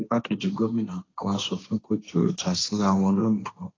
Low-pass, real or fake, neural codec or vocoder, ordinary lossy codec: 7.2 kHz; fake; codec, 16 kHz, 1.1 kbps, Voila-Tokenizer; AAC, 32 kbps